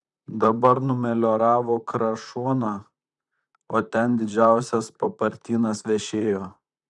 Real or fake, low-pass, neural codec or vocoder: fake; 10.8 kHz; vocoder, 44.1 kHz, 128 mel bands, Pupu-Vocoder